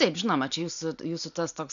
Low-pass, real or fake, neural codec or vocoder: 7.2 kHz; real; none